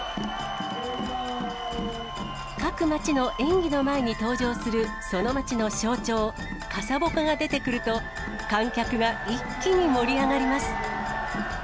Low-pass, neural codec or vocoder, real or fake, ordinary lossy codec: none; none; real; none